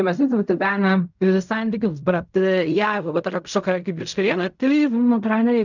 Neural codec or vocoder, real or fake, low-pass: codec, 16 kHz in and 24 kHz out, 0.4 kbps, LongCat-Audio-Codec, fine tuned four codebook decoder; fake; 7.2 kHz